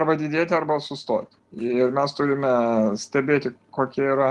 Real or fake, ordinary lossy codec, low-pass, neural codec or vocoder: real; Opus, 24 kbps; 10.8 kHz; none